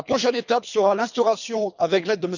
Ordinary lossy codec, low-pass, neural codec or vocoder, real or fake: none; 7.2 kHz; codec, 24 kHz, 3 kbps, HILCodec; fake